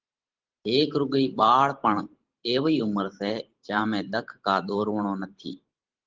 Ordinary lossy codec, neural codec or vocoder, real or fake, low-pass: Opus, 16 kbps; vocoder, 44.1 kHz, 128 mel bands every 512 samples, BigVGAN v2; fake; 7.2 kHz